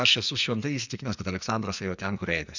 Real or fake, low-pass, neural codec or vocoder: fake; 7.2 kHz; codec, 44.1 kHz, 2.6 kbps, SNAC